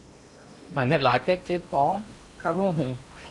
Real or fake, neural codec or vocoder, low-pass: fake; codec, 16 kHz in and 24 kHz out, 0.8 kbps, FocalCodec, streaming, 65536 codes; 10.8 kHz